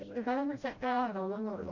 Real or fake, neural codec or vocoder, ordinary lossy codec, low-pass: fake; codec, 16 kHz, 0.5 kbps, FreqCodec, smaller model; none; 7.2 kHz